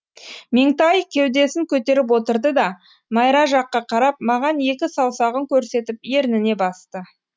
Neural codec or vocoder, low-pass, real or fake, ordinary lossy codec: none; none; real; none